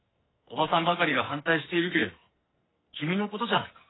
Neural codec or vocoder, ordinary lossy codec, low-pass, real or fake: codec, 44.1 kHz, 2.6 kbps, SNAC; AAC, 16 kbps; 7.2 kHz; fake